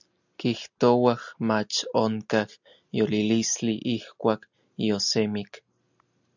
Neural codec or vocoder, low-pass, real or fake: none; 7.2 kHz; real